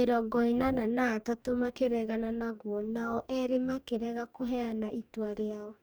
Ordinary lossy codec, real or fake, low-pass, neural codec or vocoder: none; fake; none; codec, 44.1 kHz, 2.6 kbps, DAC